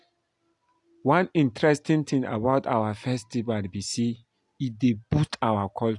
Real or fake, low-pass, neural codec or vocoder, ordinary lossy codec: real; 10.8 kHz; none; AAC, 64 kbps